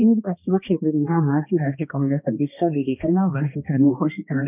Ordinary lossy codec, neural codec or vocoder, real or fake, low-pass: none; codec, 16 kHz, 1 kbps, X-Codec, HuBERT features, trained on balanced general audio; fake; 3.6 kHz